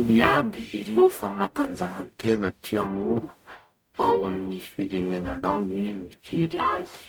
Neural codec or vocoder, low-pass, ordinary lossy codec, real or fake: codec, 44.1 kHz, 0.9 kbps, DAC; none; none; fake